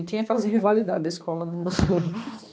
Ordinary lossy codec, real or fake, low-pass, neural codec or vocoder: none; fake; none; codec, 16 kHz, 2 kbps, X-Codec, HuBERT features, trained on balanced general audio